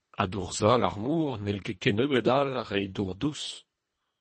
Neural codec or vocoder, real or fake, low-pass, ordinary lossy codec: codec, 24 kHz, 1.5 kbps, HILCodec; fake; 10.8 kHz; MP3, 32 kbps